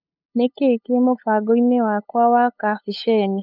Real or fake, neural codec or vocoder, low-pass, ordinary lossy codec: fake; codec, 16 kHz, 8 kbps, FunCodec, trained on LibriTTS, 25 frames a second; 5.4 kHz; none